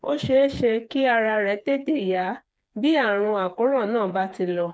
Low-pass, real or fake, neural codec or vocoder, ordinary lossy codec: none; fake; codec, 16 kHz, 4 kbps, FreqCodec, smaller model; none